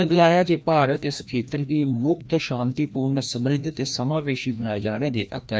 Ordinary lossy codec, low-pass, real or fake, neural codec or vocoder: none; none; fake; codec, 16 kHz, 1 kbps, FreqCodec, larger model